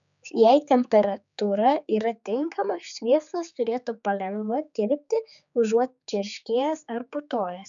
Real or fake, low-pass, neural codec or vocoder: fake; 7.2 kHz; codec, 16 kHz, 4 kbps, X-Codec, HuBERT features, trained on general audio